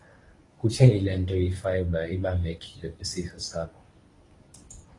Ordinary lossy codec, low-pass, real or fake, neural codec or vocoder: AAC, 32 kbps; 10.8 kHz; fake; codec, 24 kHz, 0.9 kbps, WavTokenizer, medium speech release version 1